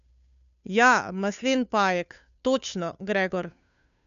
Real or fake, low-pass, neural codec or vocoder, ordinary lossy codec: fake; 7.2 kHz; codec, 16 kHz, 2 kbps, FunCodec, trained on Chinese and English, 25 frames a second; none